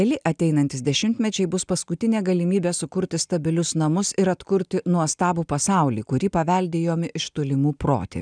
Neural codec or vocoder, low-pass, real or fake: none; 9.9 kHz; real